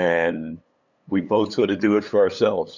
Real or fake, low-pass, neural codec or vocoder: fake; 7.2 kHz; codec, 16 kHz, 8 kbps, FunCodec, trained on LibriTTS, 25 frames a second